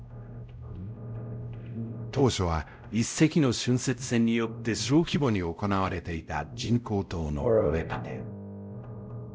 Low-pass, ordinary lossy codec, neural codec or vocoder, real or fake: none; none; codec, 16 kHz, 0.5 kbps, X-Codec, WavLM features, trained on Multilingual LibriSpeech; fake